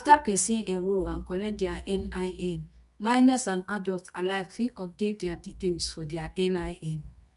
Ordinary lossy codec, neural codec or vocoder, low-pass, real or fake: none; codec, 24 kHz, 0.9 kbps, WavTokenizer, medium music audio release; 10.8 kHz; fake